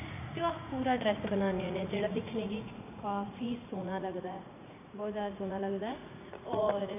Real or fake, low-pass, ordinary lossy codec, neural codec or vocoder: fake; 3.6 kHz; none; vocoder, 44.1 kHz, 80 mel bands, Vocos